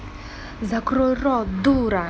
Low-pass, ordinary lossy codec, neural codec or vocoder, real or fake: none; none; none; real